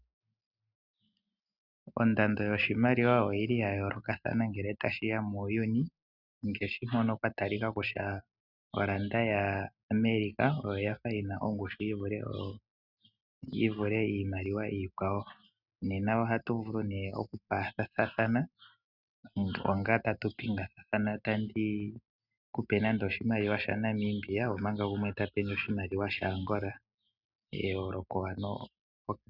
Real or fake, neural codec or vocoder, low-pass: real; none; 5.4 kHz